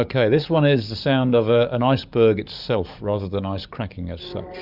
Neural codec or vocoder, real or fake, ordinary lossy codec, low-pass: codec, 44.1 kHz, 7.8 kbps, DAC; fake; Opus, 64 kbps; 5.4 kHz